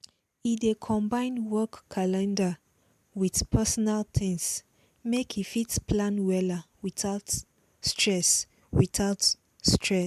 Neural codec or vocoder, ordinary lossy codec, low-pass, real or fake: none; MP3, 96 kbps; 14.4 kHz; real